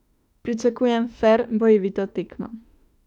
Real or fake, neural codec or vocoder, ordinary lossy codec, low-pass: fake; autoencoder, 48 kHz, 32 numbers a frame, DAC-VAE, trained on Japanese speech; none; 19.8 kHz